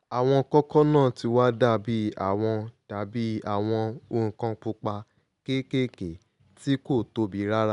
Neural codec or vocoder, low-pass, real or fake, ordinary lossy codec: none; 10.8 kHz; real; none